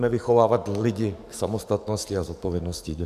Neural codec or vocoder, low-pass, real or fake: codec, 44.1 kHz, 7.8 kbps, DAC; 14.4 kHz; fake